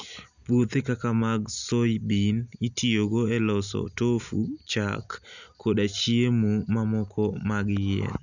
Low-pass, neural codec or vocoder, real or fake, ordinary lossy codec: 7.2 kHz; none; real; none